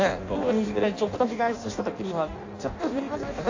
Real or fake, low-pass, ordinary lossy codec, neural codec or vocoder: fake; 7.2 kHz; AAC, 48 kbps; codec, 16 kHz in and 24 kHz out, 0.6 kbps, FireRedTTS-2 codec